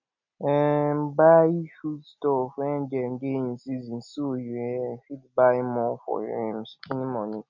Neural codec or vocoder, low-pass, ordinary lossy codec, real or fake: none; 7.2 kHz; none; real